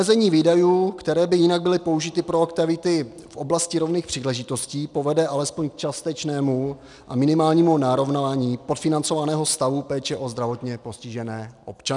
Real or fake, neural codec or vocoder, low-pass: real; none; 10.8 kHz